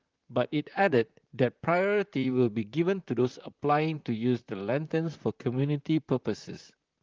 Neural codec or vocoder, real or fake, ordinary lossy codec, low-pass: vocoder, 44.1 kHz, 128 mel bands, Pupu-Vocoder; fake; Opus, 32 kbps; 7.2 kHz